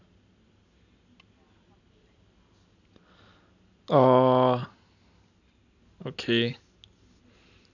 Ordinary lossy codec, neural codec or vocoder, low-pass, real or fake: none; none; 7.2 kHz; real